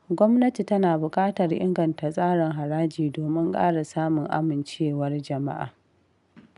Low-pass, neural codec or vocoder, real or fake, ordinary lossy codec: 10.8 kHz; none; real; none